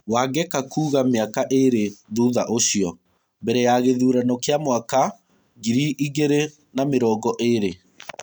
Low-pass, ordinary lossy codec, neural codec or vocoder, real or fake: none; none; none; real